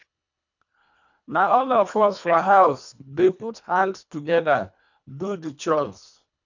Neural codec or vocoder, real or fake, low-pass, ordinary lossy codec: codec, 24 kHz, 1.5 kbps, HILCodec; fake; 7.2 kHz; none